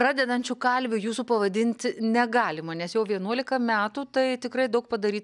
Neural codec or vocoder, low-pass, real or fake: none; 10.8 kHz; real